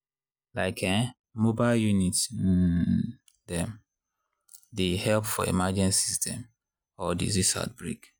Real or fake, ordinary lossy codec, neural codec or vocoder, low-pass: real; none; none; none